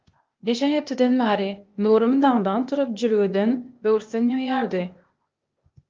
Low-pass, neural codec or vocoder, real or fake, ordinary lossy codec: 7.2 kHz; codec, 16 kHz, 0.8 kbps, ZipCodec; fake; Opus, 24 kbps